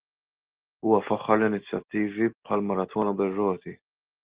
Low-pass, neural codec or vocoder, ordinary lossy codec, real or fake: 3.6 kHz; none; Opus, 16 kbps; real